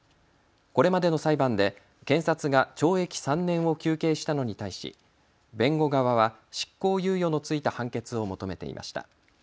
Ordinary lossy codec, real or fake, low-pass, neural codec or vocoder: none; real; none; none